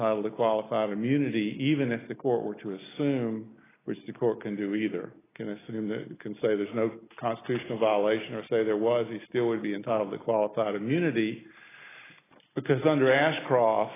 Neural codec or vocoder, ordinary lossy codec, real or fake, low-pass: none; AAC, 16 kbps; real; 3.6 kHz